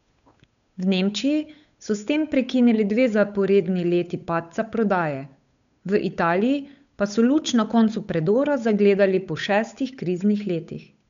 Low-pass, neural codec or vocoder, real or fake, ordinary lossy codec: 7.2 kHz; codec, 16 kHz, 8 kbps, FunCodec, trained on Chinese and English, 25 frames a second; fake; none